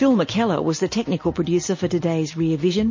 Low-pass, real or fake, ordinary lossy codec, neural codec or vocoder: 7.2 kHz; real; MP3, 32 kbps; none